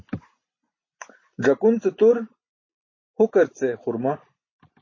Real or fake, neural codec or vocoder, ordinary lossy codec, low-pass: real; none; MP3, 32 kbps; 7.2 kHz